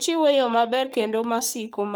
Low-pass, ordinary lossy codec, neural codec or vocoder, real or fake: none; none; codec, 44.1 kHz, 3.4 kbps, Pupu-Codec; fake